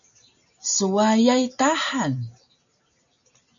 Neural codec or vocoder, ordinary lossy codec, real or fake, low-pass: none; MP3, 96 kbps; real; 7.2 kHz